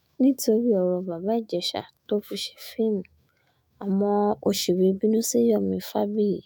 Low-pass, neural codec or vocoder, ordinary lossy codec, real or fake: none; autoencoder, 48 kHz, 128 numbers a frame, DAC-VAE, trained on Japanese speech; none; fake